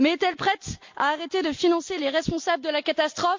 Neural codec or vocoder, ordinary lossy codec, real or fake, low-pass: none; MP3, 48 kbps; real; 7.2 kHz